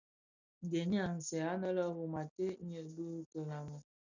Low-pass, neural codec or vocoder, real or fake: 7.2 kHz; none; real